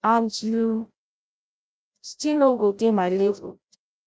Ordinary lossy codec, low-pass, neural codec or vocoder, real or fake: none; none; codec, 16 kHz, 0.5 kbps, FreqCodec, larger model; fake